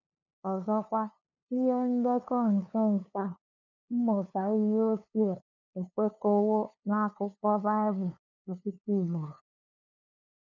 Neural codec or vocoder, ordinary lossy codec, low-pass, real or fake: codec, 16 kHz, 2 kbps, FunCodec, trained on LibriTTS, 25 frames a second; none; 7.2 kHz; fake